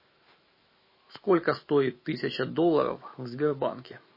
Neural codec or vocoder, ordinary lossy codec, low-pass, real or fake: autoencoder, 48 kHz, 128 numbers a frame, DAC-VAE, trained on Japanese speech; MP3, 24 kbps; 5.4 kHz; fake